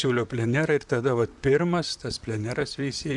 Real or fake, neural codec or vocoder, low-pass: fake; vocoder, 44.1 kHz, 128 mel bands, Pupu-Vocoder; 10.8 kHz